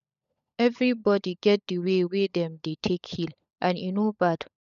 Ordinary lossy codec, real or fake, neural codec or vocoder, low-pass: none; fake; codec, 16 kHz, 16 kbps, FunCodec, trained on LibriTTS, 50 frames a second; 7.2 kHz